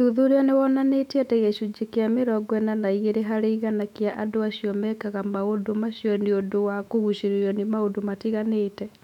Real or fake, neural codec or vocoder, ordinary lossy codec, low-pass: fake; autoencoder, 48 kHz, 128 numbers a frame, DAC-VAE, trained on Japanese speech; none; 19.8 kHz